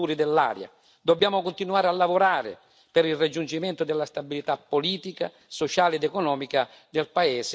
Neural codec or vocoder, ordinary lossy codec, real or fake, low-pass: none; none; real; none